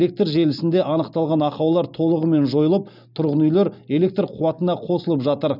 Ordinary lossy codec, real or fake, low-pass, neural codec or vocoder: none; real; 5.4 kHz; none